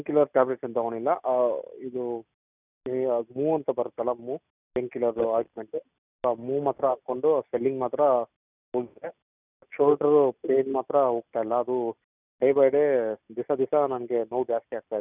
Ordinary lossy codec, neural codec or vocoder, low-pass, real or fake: none; none; 3.6 kHz; real